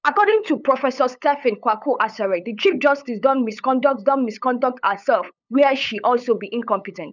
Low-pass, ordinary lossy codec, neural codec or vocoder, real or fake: 7.2 kHz; none; codec, 16 kHz, 8 kbps, FunCodec, trained on LibriTTS, 25 frames a second; fake